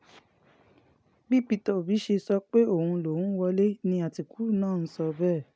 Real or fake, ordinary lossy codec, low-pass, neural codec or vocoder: real; none; none; none